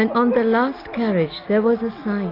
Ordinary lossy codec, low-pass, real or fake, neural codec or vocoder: AAC, 24 kbps; 5.4 kHz; real; none